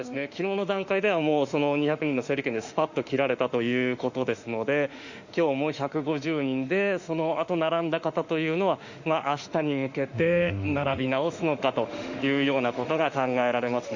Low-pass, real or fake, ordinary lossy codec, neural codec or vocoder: 7.2 kHz; fake; Opus, 64 kbps; autoencoder, 48 kHz, 32 numbers a frame, DAC-VAE, trained on Japanese speech